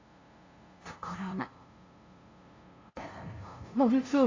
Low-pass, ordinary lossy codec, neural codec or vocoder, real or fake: 7.2 kHz; AAC, 32 kbps; codec, 16 kHz, 0.5 kbps, FunCodec, trained on LibriTTS, 25 frames a second; fake